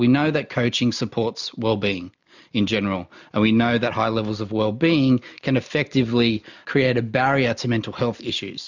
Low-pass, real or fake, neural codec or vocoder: 7.2 kHz; real; none